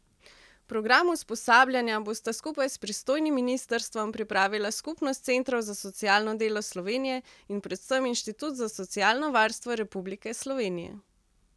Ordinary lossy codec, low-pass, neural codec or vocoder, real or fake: none; none; none; real